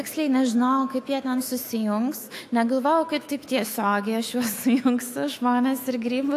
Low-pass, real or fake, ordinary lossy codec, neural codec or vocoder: 14.4 kHz; fake; AAC, 64 kbps; autoencoder, 48 kHz, 128 numbers a frame, DAC-VAE, trained on Japanese speech